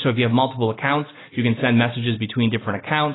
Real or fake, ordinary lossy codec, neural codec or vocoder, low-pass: real; AAC, 16 kbps; none; 7.2 kHz